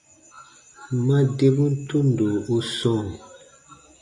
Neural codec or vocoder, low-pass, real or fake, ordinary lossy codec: none; 10.8 kHz; real; MP3, 96 kbps